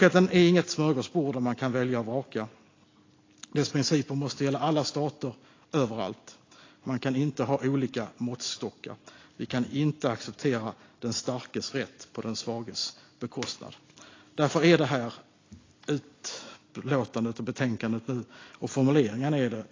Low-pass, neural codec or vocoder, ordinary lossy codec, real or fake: 7.2 kHz; none; AAC, 32 kbps; real